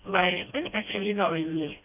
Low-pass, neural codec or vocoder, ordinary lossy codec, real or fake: 3.6 kHz; codec, 16 kHz, 1 kbps, FreqCodec, smaller model; none; fake